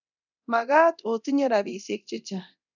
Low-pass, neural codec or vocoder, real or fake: 7.2 kHz; codec, 24 kHz, 0.9 kbps, DualCodec; fake